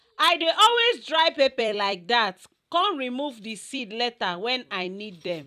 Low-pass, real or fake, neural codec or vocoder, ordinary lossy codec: 14.4 kHz; fake; vocoder, 44.1 kHz, 128 mel bands every 256 samples, BigVGAN v2; none